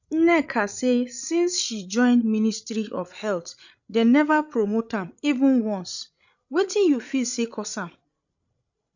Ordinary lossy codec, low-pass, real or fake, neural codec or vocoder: none; 7.2 kHz; fake; codec, 16 kHz, 16 kbps, FreqCodec, larger model